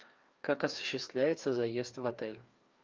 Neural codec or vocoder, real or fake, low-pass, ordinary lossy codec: codec, 16 kHz, 2 kbps, FreqCodec, larger model; fake; 7.2 kHz; Opus, 24 kbps